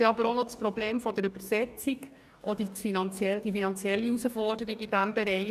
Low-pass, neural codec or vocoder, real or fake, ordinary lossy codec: 14.4 kHz; codec, 44.1 kHz, 2.6 kbps, DAC; fake; none